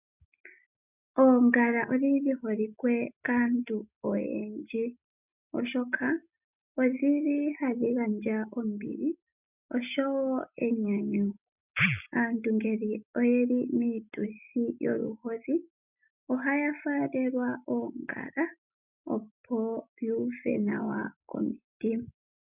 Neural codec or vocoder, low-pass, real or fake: none; 3.6 kHz; real